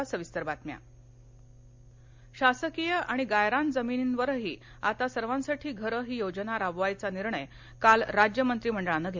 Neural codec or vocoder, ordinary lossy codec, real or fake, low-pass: none; MP3, 64 kbps; real; 7.2 kHz